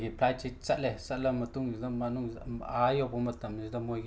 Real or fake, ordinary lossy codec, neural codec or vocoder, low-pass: real; none; none; none